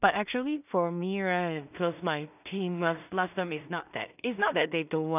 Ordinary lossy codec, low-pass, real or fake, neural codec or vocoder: none; 3.6 kHz; fake; codec, 16 kHz in and 24 kHz out, 0.4 kbps, LongCat-Audio-Codec, two codebook decoder